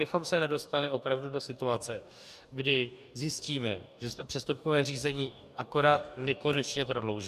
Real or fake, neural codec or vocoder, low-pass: fake; codec, 44.1 kHz, 2.6 kbps, DAC; 14.4 kHz